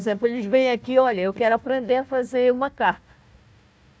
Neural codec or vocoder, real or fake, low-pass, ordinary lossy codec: codec, 16 kHz, 1 kbps, FunCodec, trained on Chinese and English, 50 frames a second; fake; none; none